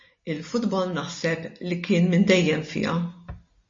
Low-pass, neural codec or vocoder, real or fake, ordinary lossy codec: 7.2 kHz; none; real; MP3, 32 kbps